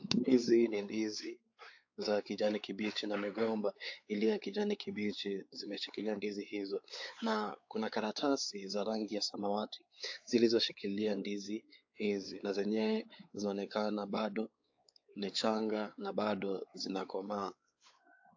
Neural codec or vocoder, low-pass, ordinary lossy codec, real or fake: codec, 16 kHz, 4 kbps, X-Codec, WavLM features, trained on Multilingual LibriSpeech; 7.2 kHz; AAC, 48 kbps; fake